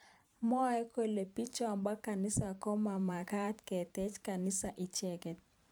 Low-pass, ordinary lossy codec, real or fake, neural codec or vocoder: none; none; fake; vocoder, 44.1 kHz, 128 mel bands every 512 samples, BigVGAN v2